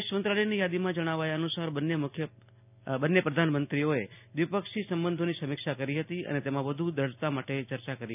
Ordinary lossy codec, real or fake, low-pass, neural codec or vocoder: none; real; 3.6 kHz; none